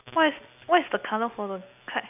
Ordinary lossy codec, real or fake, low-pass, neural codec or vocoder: none; real; 3.6 kHz; none